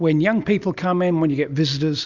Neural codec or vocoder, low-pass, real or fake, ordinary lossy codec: none; 7.2 kHz; real; Opus, 64 kbps